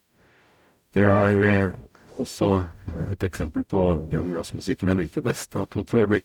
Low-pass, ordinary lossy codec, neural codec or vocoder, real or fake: 19.8 kHz; none; codec, 44.1 kHz, 0.9 kbps, DAC; fake